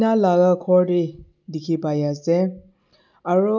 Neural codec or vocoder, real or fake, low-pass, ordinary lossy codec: none; real; 7.2 kHz; none